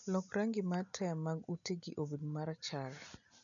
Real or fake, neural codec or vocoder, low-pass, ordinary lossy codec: real; none; 7.2 kHz; none